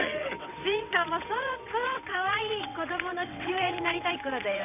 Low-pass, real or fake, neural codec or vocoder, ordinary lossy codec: 3.6 kHz; fake; vocoder, 22.05 kHz, 80 mel bands, WaveNeXt; none